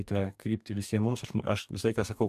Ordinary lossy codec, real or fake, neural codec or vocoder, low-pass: AAC, 96 kbps; fake; codec, 44.1 kHz, 2.6 kbps, DAC; 14.4 kHz